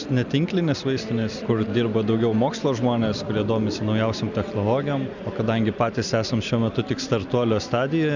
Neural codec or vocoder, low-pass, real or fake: none; 7.2 kHz; real